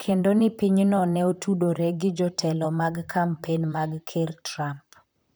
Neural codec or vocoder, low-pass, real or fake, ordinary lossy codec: vocoder, 44.1 kHz, 128 mel bands, Pupu-Vocoder; none; fake; none